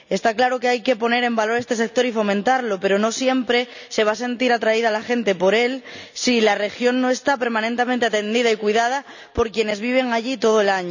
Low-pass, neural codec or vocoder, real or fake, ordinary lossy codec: 7.2 kHz; none; real; none